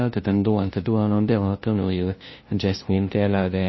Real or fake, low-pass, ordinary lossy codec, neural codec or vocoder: fake; 7.2 kHz; MP3, 24 kbps; codec, 16 kHz, 0.5 kbps, FunCodec, trained on LibriTTS, 25 frames a second